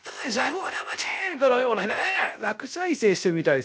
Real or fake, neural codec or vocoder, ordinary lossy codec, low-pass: fake; codec, 16 kHz, 0.3 kbps, FocalCodec; none; none